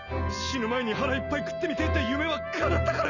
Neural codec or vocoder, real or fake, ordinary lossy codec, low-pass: none; real; none; 7.2 kHz